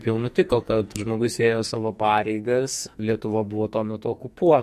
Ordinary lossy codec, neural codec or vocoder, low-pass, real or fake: MP3, 64 kbps; codec, 44.1 kHz, 2.6 kbps, SNAC; 14.4 kHz; fake